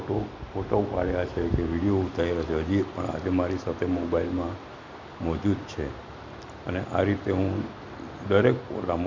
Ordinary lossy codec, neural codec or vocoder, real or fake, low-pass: MP3, 64 kbps; none; real; 7.2 kHz